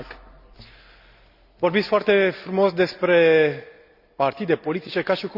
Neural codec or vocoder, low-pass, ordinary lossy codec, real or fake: none; 5.4 kHz; Opus, 64 kbps; real